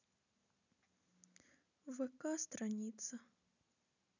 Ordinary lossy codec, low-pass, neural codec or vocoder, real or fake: none; 7.2 kHz; none; real